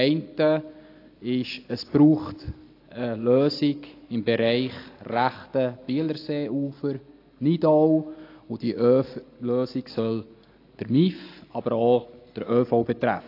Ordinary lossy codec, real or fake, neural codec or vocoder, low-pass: AAC, 32 kbps; real; none; 5.4 kHz